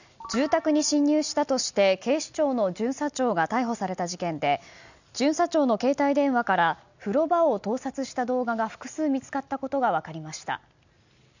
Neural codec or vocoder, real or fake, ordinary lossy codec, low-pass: none; real; none; 7.2 kHz